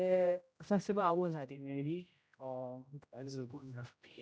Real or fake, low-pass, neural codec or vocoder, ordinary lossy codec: fake; none; codec, 16 kHz, 0.5 kbps, X-Codec, HuBERT features, trained on general audio; none